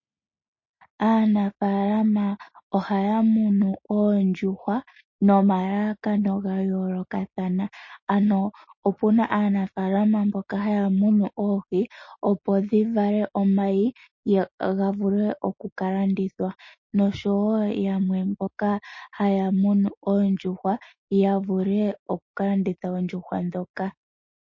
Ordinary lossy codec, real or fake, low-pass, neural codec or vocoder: MP3, 32 kbps; real; 7.2 kHz; none